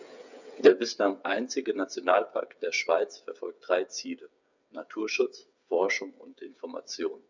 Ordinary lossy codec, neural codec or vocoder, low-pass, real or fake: none; codec, 16 kHz, 8 kbps, FreqCodec, smaller model; 7.2 kHz; fake